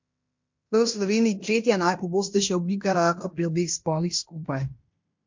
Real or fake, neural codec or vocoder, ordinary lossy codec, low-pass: fake; codec, 16 kHz in and 24 kHz out, 0.9 kbps, LongCat-Audio-Codec, fine tuned four codebook decoder; MP3, 48 kbps; 7.2 kHz